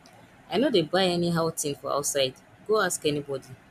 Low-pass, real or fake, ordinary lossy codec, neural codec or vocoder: 14.4 kHz; real; none; none